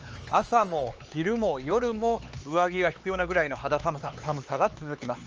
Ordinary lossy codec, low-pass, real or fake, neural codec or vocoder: Opus, 24 kbps; 7.2 kHz; fake; codec, 16 kHz, 4 kbps, X-Codec, HuBERT features, trained on LibriSpeech